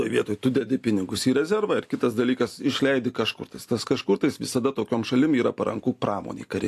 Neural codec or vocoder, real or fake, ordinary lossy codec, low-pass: none; real; AAC, 64 kbps; 14.4 kHz